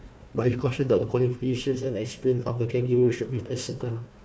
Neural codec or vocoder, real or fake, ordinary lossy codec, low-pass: codec, 16 kHz, 1 kbps, FunCodec, trained on Chinese and English, 50 frames a second; fake; none; none